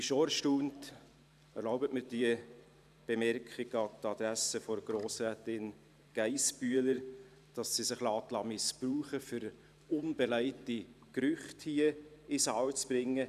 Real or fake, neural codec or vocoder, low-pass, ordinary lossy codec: fake; vocoder, 44.1 kHz, 128 mel bands every 512 samples, BigVGAN v2; 14.4 kHz; none